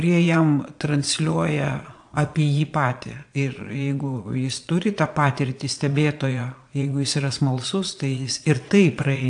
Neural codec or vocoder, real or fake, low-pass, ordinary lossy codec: vocoder, 22.05 kHz, 80 mel bands, Vocos; fake; 9.9 kHz; AAC, 64 kbps